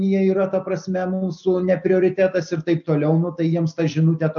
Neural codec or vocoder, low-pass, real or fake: none; 7.2 kHz; real